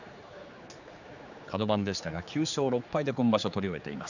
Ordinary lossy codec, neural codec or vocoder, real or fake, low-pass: none; codec, 16 kHz, 4 kbps, X-Codec, HuBERT features, trained on general audio; fake; 7.2 kHz